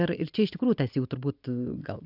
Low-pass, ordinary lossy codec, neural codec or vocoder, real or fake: 5.4 kHz; AAC, 48 kbps; none; real